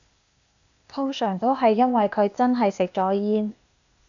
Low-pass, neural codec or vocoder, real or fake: 7.2 kHz; codec, 16 kHz, 0.8 kbps, ZipCodec; fake